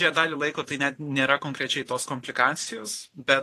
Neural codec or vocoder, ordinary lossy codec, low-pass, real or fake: vocoder, 44.1 kHz, 128 mel bands every 512 samples, BigVGAN v2; AAC, 48 kbps; 14.4 kHz; fake